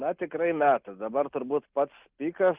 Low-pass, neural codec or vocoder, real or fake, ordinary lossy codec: 3.6 kHz; none; real; Opus, 32 kbps